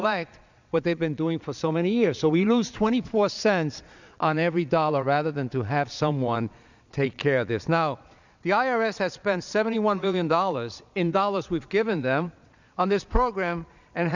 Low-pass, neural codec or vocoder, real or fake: 7.2 kHz; vocoder, 22.05 kHz, 80 mel bands, Vocos; fake